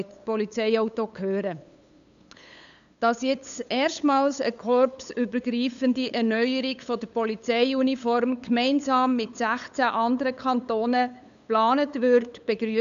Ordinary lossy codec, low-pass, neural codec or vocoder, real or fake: none; 7.2 kHz; codec, 16 kHz, 8 kbps, FunCodec, trained on LibriTTS, 25 frames a second; fake